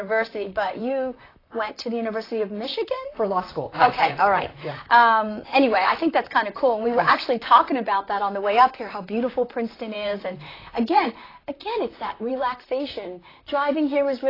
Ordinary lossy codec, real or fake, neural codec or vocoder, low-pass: AAC, 24 kbps; fake; vocoder, 44.1 kHz, 128 mel bands, Pupu-Vocoder; 5.4 kHz